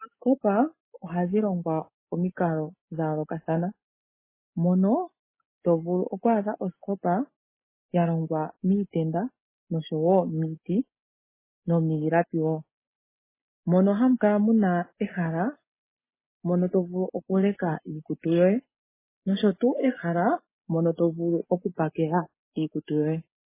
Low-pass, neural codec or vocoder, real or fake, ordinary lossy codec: 3.6 kHz; none; real; MP3, 16 kbps